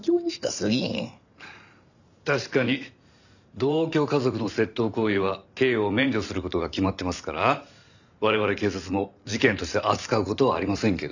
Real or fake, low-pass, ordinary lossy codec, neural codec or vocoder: fake; 7.2 kHz; none; vocoder, 44.1 kHz, 128 mel bands every 512 samples, BigVGAN v2